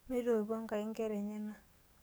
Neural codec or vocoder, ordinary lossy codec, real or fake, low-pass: codec, 44.1 kHz, 7.8 kbps, DAC; none; fake; none